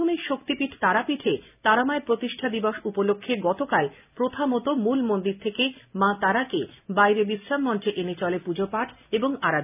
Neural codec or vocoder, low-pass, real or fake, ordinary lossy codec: none; 3.6 kHz; real; none